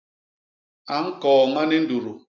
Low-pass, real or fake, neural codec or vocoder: 7.2 kHz; real; none